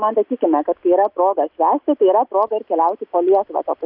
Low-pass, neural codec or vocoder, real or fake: 5.4 kHz; none; real